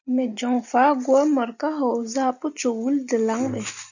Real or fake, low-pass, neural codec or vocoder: real; 7.2 kHz; none